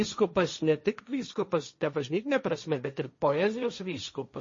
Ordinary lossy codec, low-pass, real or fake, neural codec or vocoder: MP3, 32 kbps; 7.2 kHz; fake; codec, 16 kHz, 1.1 kbps, Voila-Tokenizer